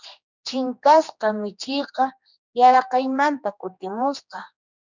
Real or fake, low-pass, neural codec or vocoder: fake; 7.2 kHz; codec, 16 kHz, 2 kbps, X-Codec, HuBERT features, trained on general audio